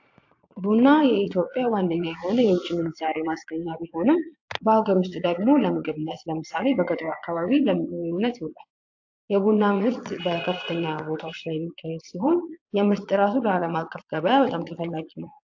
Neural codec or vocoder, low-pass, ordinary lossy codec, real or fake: vocoder, 22.05 kHz, 80 mel bands, Vocos; 7.2 kHz; AAC, 48 kbps; fake